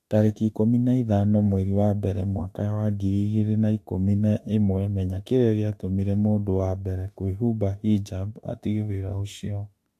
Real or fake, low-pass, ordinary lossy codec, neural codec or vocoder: fake; 14.4 kHz; AAC, 64 kbps; autoencoder, 48 kHz, 32 numbers a frame, DAC-VAE, trained on Japanese speech